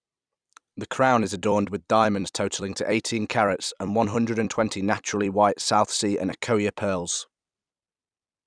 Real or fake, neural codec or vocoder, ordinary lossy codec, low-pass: fake; vocoder, 44.1 kHz, 128 mel bands every 256 samples, BigVGAN v2; none; 9.9 kHz